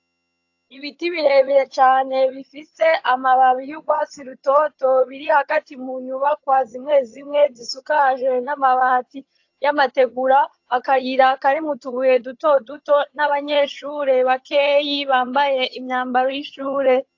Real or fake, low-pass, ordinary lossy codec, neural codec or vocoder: fake; 7.2 kHz; AAC, 48 kbps; vocoder, 22.05 kHz, 80 mel bands, HiFi-GAN